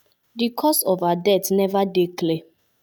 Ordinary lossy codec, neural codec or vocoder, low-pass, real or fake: none; none; none; real